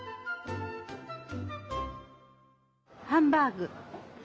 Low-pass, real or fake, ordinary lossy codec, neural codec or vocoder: none; real; none; none